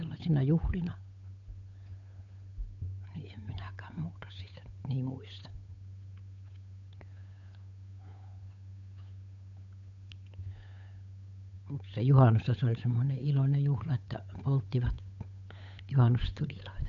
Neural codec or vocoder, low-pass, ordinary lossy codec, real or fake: codec, 16 kHz, 8 kbps, FunCodec, trained on Chinese and English, 25 frames a second; 7.2 kHz; none; fake